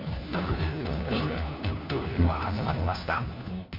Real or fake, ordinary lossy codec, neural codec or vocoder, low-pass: fake; MP3, 32 kbps; codec, 16 kHz, 1 kbps, FunCodec, trained on LibriTTS, 50 frames a second; 5.4 kHz